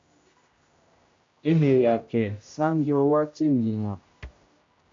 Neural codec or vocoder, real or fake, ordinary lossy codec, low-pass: codec, 16 kHz, 0.5 kbps, X-Codec, HuBERT features, trained on general audio; fake; MP3, 48 kbps; 7.2 kHz